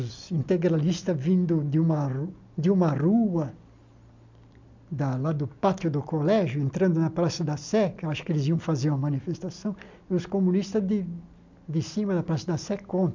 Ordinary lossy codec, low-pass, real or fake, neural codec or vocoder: MP3, 64 kbps; 7.2 kHz; real; none